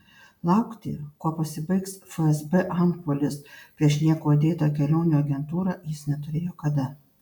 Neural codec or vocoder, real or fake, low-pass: none; real; 19.8 kHz